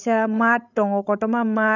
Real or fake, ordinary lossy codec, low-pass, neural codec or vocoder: fake; none; 7.2 kHz; codec, 16 kHz, 16 kbps, FreqCodec, larger model